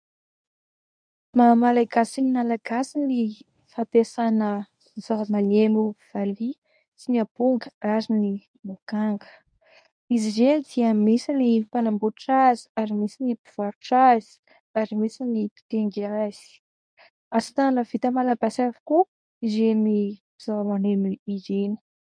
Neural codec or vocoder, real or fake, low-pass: codec, 24 kHz, 0.9 kbps, WavTokenizer, medium speech release version 1; fake; 9.9 kHz